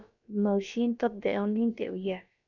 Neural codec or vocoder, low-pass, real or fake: codec, 16 kHz, about 1 kbps, DyCAST, with the encoder's durations; 7.2 kHz; fake